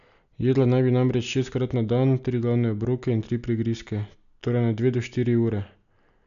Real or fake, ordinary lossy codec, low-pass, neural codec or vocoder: real; none; 7.2 kHz; none